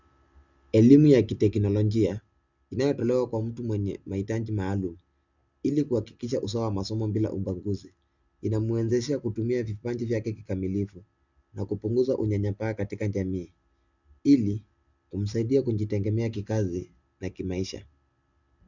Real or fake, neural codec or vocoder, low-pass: real; none; 7.2 kHz